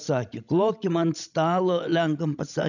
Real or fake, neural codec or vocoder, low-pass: fake; codec, 16 kHz, 16 kbps, FreqCodec, larger model; 7.2 kHz